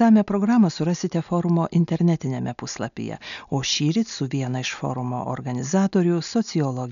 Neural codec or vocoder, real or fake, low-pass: none; real; 7.2 kHz